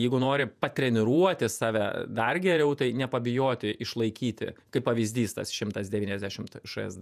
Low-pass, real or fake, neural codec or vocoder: 14.4 kHz; fake; vocoder, 48 kHz, 128 mel bands, Vocos